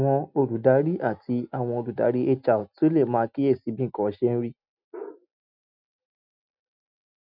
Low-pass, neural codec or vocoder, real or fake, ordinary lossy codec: 5.4 kHz; none; real; none